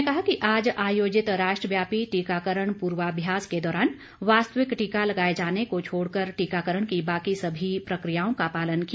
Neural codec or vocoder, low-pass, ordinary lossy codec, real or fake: none; none; none; real